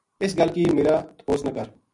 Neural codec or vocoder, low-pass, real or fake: none; 10.8 kHz; real